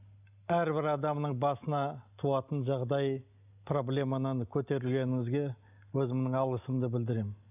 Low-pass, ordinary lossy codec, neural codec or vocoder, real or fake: 3.6 kHz; none; none; real